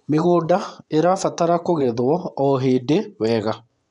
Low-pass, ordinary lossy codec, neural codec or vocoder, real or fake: 10.8 kHz; none; vocoder, 24 kHz, 100 mel bands, Vocos; fake